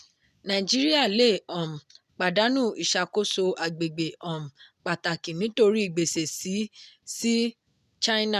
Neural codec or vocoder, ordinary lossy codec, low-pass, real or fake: none; none; 14.4 kHz; real